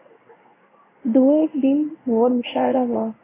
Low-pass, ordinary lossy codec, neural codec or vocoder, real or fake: 3.6 kHz; AAC, 16 kbps; codec, 16 kHz in and 24 kHz out, 1 kbps, XY-Tokenizer; fake